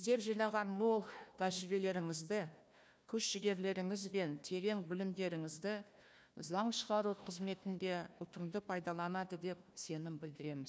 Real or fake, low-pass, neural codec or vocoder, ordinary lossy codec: fake; none; codec, 16 kHz, 1 kbps, FunCodec, trained on Chinese and English, 50 frames a second; none